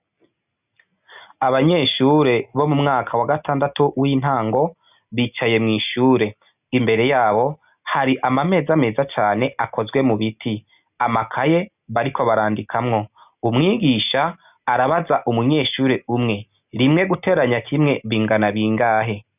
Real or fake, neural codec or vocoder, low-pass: real; none; 3.6 kHz